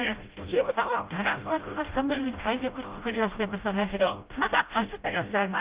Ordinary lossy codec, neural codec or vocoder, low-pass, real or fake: Opus, 32 kbps; codec, 16 kHz, 0.5 kbps, FreqCodec, smaller model; 3.6 kHz; fake